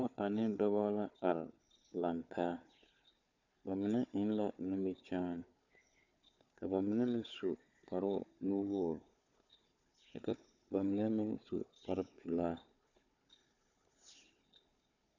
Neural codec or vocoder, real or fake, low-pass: codec, 16 kHz, 4 kbps, FunCodec, trained on Chinese and English, 50 frames a second; fake; 7.2 kHz